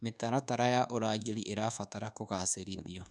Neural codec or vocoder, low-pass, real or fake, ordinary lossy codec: codec, 24 kHz, 1.2 kbps, DualCodec; none; fake; none